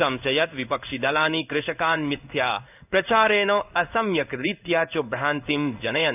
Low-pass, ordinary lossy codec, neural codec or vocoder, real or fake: 3.6 kHz; none; codec, 16 kHz in and 24 kHz out, 1 kbps, XY-Tokenizer; fake